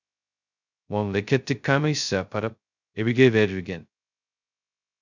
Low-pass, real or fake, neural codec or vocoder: 7.2 kHz; fake; codec, 16 kHz, 0.2 kbps, FocalCodec